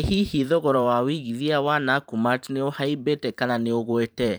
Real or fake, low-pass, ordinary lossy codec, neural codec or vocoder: fake; none; none; vocoder, 44.1 kHz, 128 mel bands every 512 samples, BigVGAN v2